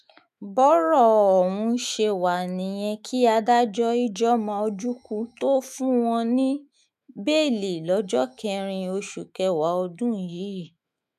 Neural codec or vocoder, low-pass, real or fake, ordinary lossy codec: autoencoder, 48 kHz, 128 numbers a frame, DAC-VAE, trained on Japanese speech; 14.4 kHz; fake; none